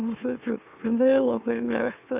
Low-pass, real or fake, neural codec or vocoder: 3.6 kHz; fake; autoencoder, 44.1 kHz, a latent of 192 numbers a frame, MeloTTS